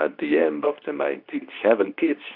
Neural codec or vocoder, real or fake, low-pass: codec, 24 kHz, 0.9 kbps, WavTokenizer, medium speech release version 2; fake; 5.4 kHz